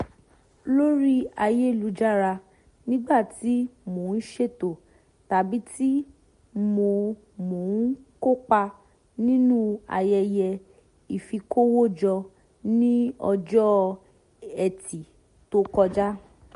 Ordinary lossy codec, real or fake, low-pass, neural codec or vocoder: MP3, 48 kbps; real; 10.8 kHz; none